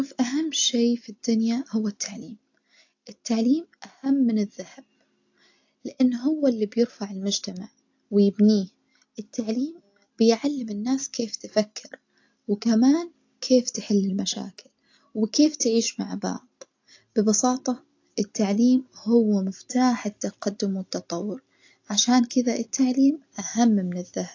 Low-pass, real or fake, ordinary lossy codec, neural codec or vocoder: 7.2 kHz; real; AAC, 48 kbps; none